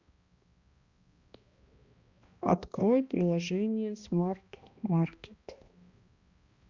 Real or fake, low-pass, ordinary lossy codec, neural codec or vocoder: fake; 7.2 kHz; Opus, 64 kbps; codec, 16 kHz, 1 kbps, X-Codec, HuBERT features, trained on balanced general audio